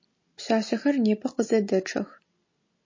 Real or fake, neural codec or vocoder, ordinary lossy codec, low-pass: real; none; MP3, 32 kbps; 7.2 kHz